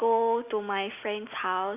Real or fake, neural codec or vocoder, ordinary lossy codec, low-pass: real; none; none; 3.6 kHz